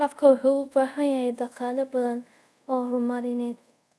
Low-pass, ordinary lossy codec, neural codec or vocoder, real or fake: none; none; codec, 24 kHz, 0.5 kbps, DualCodec; fake